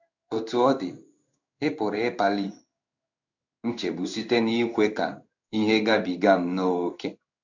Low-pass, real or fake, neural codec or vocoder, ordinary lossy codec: 7.2 kHz; fake; codec, 16 kHz in and 24 kHz out, 1 kbps, XY-Tokenizer; none